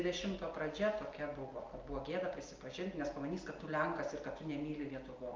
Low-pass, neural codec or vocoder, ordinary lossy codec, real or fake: 7.2 kHz; none; Opus, 24 kbps; real